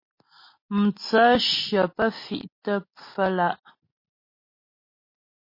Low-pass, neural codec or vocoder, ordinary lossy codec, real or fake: 5.4 kHz; none; MP3, 24 kbps; real